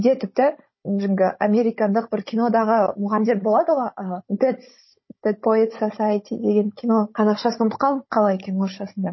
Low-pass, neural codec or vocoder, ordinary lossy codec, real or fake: 7.2 kHz; codec, 16 kHz, 16 kbps, FunCodec, trained on Chinese and English, 50 frames a second; MP3, 24 kbps; fake